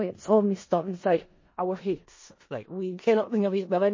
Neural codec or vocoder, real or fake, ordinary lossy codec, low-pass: codec, 16 kHz in and 24 kHz out, 0.4 kbps, LongCat-Audio-Codec, four codebook decoder; fake; MP3, 32 kbps; 7.2 kHz